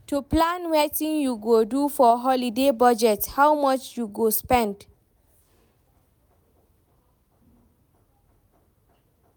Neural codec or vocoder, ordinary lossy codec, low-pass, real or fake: none; none; none; real